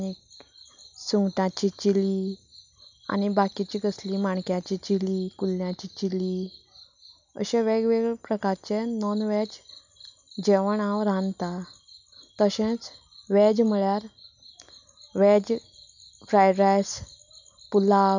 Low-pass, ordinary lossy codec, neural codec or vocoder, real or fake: 7.2 kHz; none; none; real